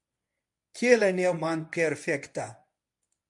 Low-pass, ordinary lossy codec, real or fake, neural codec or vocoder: 10.8 kHz; MP3, 64 kbps; fake; codec, 24 kHz, 0.9 kbps, WavTokenizer, medium speech release version 1